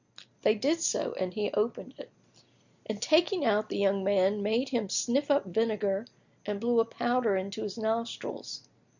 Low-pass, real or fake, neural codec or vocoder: 7.2 kHz; real; none